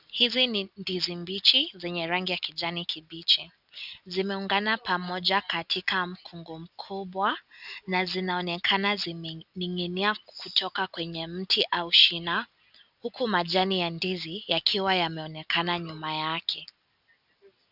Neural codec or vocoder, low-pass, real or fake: none; 5.4 kHz; real